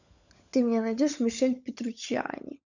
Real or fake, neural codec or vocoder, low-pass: fake; codec, 16 kHz, 16 kbps, FunCodec, trained on LibriTTS, 50 frames a second; 7.2 kHz